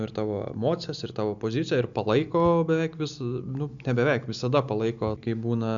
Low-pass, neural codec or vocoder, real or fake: 7.2 kHz; none; real